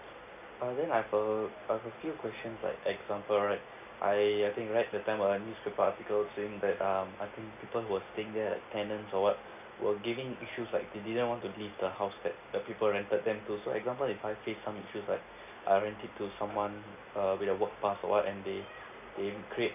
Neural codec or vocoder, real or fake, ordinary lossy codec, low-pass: none; real; none; 3.6 kHz